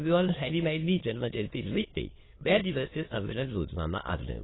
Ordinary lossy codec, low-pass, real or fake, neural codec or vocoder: AAC, 16 kbps; 7.2 kHz; fake; autoencoder, 22.05 kHz, a latent of 192 numbers a frame, VITS, trained on many speakers